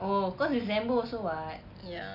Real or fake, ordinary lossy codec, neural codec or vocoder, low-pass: real; none; none; 5.4 kHz